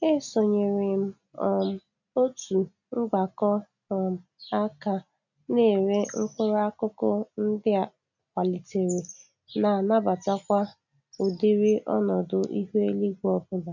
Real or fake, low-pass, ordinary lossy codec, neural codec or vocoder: real; 7.2 kHz; none; none